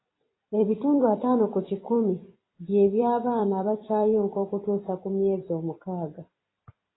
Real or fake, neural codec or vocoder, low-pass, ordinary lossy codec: fake; vocoder, 44.1 kHz, 128 mel bands every 256 samples, BigVGAN v2; 7.2 kHz; AAC, 16 kbps